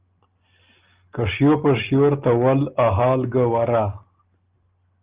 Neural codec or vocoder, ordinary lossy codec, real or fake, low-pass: none; Opus, 32 kbps; real; 3.6 kHz